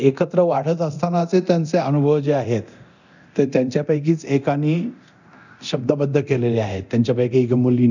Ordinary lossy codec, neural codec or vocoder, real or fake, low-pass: none; codec, 24 kHz, 0.9 kbps, DualCodec; fake; 7.2 kHz